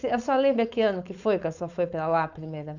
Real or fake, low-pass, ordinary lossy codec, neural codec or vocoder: fake; 7.2 kHz; none; codec, 16 kHz, 4.8 kbps, FACodec